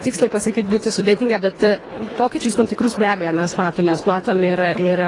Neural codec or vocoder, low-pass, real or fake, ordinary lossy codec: codec, 24 kHz, 1.5 kbps, HILCodec; 10.8 kHz; fake; AAC, 32 kbps